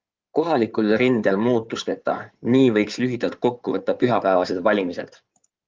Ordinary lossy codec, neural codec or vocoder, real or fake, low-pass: Opus, 32 kbps; codec, 16 kHz in and 24 kHz out, 2.2 kbps, FireRedTTS-2 codec; fake; 7.2 kHz